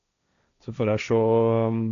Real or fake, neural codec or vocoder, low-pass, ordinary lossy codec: fake; codec, 16 kHz, 1.1 kbps, Voila-Tokenizer; 7.2 kHz; none